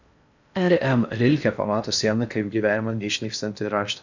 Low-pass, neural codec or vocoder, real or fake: 7.2 kHz; codec, 16 kHz in and 24 kHz out, 0.6 kbps, FocalCodec, streaming, 4096 codes; fake